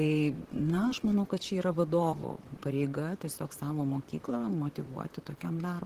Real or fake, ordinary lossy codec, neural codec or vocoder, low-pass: fake; Opus, 16 kbps; vocoder, 44.1 kHz, 128 mel bands, Pupu-Vocoder; 14.4 kHz